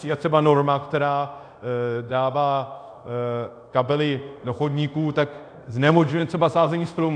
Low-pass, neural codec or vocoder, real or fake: 9.9 kHz; codec, 24 kHz, 0.5 kbps, DualCodec; fake